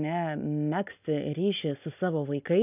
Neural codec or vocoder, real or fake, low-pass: none; real; 3.6 kHz